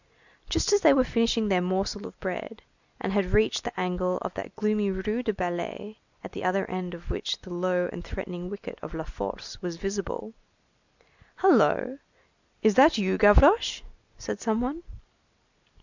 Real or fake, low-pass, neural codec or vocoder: real; 7.2 kHz; none